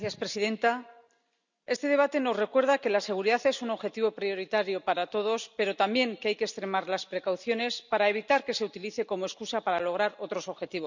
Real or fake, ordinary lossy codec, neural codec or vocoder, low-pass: real; none; none; 7.2 kHz